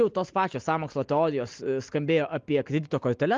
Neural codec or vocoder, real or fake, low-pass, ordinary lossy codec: none; real; 7.2 kHz; Opus, 32 kbps